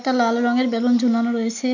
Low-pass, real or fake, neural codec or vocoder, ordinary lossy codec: 7.2 kHz; real; none; none